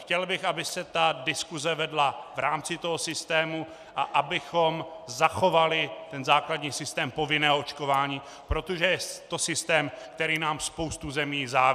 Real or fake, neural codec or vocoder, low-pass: real; none; 14.4 kHz